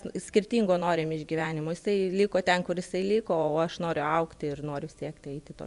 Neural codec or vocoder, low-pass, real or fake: vocoder, 24 kHz, 100 mel bands, Vocos; 10.8 kHz; fake